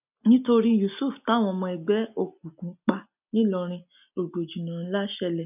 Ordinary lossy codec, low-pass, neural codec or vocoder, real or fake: none; 3.6 kHz; none; real